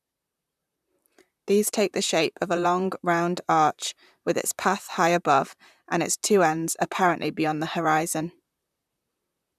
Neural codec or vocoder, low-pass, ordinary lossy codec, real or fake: vocoder, 44.1 kHz, 128 mel bands, Pupu-Vocoder; 14.4 kHz; none; fake